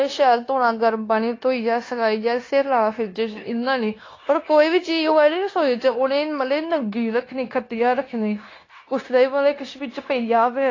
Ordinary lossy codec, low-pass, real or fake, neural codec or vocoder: AAC, 32 kbps; 7.2 kHz; fake; codec, 16 kHz, 0.9 kbps, LongCat-Audio-Codec